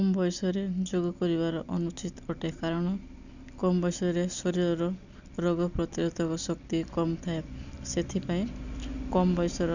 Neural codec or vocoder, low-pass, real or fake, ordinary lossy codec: none; 7.2 kHz; real; none